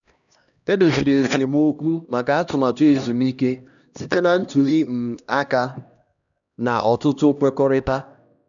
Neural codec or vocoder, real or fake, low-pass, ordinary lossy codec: codec, 16 kHz, 1 kbps, X-Codec, HuBERT features, trained on LibriSpeech; fake; 7.2 kHz; none